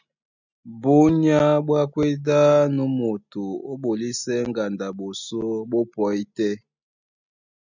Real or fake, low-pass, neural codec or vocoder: real; 7.2 kHz; none